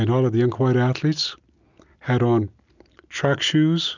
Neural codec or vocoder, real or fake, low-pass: none; real; 7.2 kHz